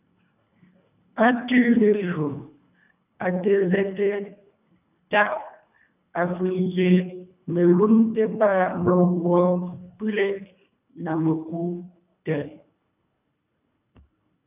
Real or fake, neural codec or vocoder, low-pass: fake; codec, 24 kHz, 1.5 kbps, HILCodec; 3.6 kHz